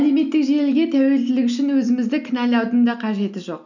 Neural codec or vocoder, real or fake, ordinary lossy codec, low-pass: none; real; none; 7.2 kHz